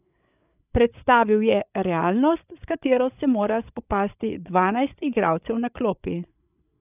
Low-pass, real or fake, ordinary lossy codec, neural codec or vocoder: 3.6 kHz; fake; none; codec, 16 kHz, 8 kbps, FreqCodec, larger model